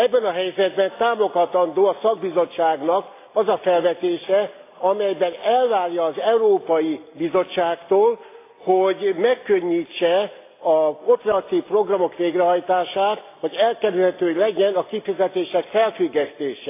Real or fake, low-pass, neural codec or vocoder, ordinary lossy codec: real; 3.6 kHz; none; AAC, 24 kbps